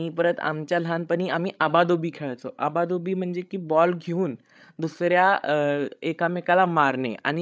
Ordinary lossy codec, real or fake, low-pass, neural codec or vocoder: none; fake; none; codec, 16 kHz, 16 kbps, FreqCodec, larger model